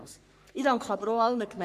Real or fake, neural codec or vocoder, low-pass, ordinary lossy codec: fake; codec, 44.1 kHz, 3.4 kbps, Pupu-Codec; 14.4 kHz; Opus, 64 kbps